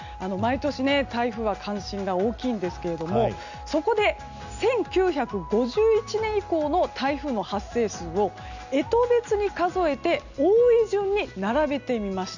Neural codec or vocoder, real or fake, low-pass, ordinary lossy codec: none; real; 7.2 kHz; none